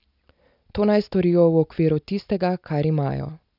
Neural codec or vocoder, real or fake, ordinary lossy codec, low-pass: none; real; none; 5.4 kHz